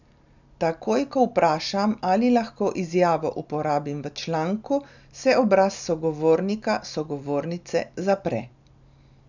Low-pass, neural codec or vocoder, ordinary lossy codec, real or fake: 7.2 kHz; none; none; real